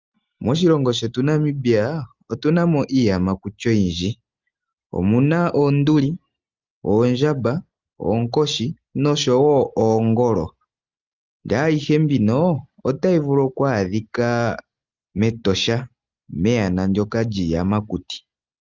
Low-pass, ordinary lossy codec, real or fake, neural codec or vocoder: 7.2 kHz; Opus, 32 kbps; real; none